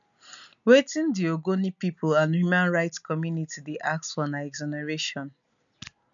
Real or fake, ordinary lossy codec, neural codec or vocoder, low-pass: real; none; none; 7.2 kHz